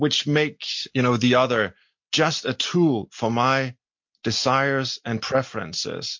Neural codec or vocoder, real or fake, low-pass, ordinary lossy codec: none; real; 7.2 kHz; MP3, 48 kbps